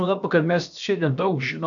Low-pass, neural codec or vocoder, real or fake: 7.2 kHz; codec, 16 kHz, about 1 kbps, DyCAST, with the encoder's durations; fake